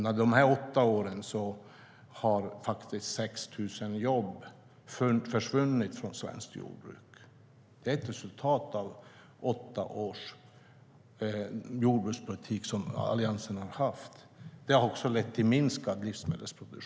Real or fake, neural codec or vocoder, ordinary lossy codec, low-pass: real; none; none; none